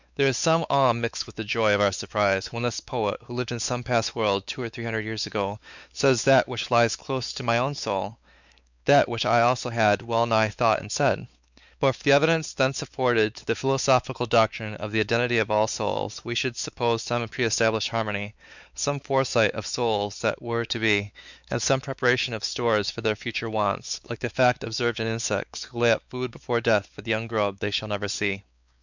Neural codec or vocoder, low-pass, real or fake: codec, 16 kHz, 4 kbps, X-Codec, WavLM features, trained on Multilingual LibriSpeech; 7.2 kHz; fake